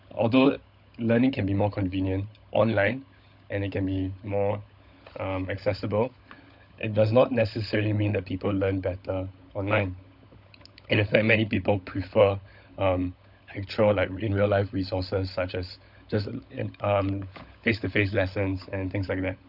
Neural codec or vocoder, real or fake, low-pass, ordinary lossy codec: codec, 16 kHz, 16 kbps, FunCodec, trained on LibriTTS, 50 frames a second; fake; 5.4 kHz; none